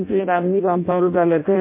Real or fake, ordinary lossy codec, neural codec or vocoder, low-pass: fake; MP3, 24 kbps; codec, 16 kHz in and 24 kHz out, 0.6 kbps, FireRedTTS-2 codec; 3.6 kHz